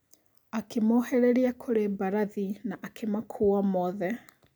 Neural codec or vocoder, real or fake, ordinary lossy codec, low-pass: none; real; none; none